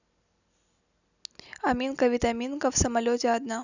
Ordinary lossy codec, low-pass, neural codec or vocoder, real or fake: none; 7.2 kHz; none; real